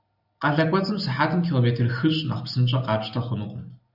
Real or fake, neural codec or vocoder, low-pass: real; none; 5.4 kHz